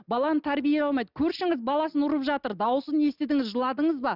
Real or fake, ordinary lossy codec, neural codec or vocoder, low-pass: real; none; none; 5.4 kHz